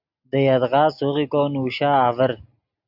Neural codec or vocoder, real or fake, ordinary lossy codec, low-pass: none; real; AAC, 48 kbps; 5.4 kHz